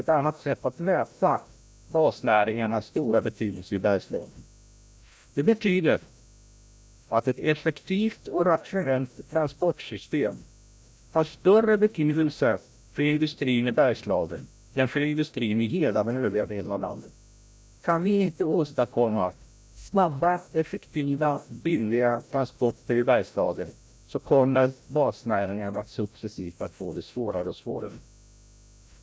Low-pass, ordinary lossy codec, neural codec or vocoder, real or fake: none; none; codec, 16 kHz, 0.5 kbps, FreqCodec, larger model; fake